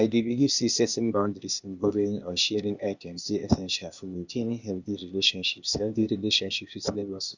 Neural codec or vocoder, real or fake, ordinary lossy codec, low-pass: codec, 16 kHz, 0.8 kbps, ZipCodec; fake; none; 7.2 kHz